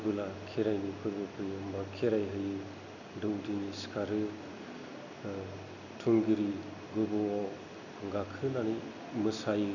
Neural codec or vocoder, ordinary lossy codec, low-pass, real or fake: none; none; 7.2 kHz; real